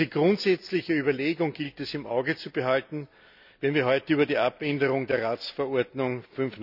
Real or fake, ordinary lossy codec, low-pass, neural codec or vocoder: real; none; 5.4 kHz; none